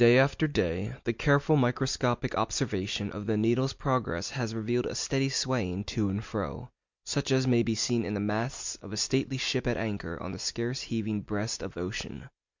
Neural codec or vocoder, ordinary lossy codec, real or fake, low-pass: none; MP3, 64 kbps; real; 7.2 kHz